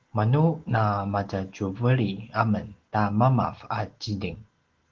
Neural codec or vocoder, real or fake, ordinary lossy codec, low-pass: none; real; Opus, 16 kbps; 7.2 kHz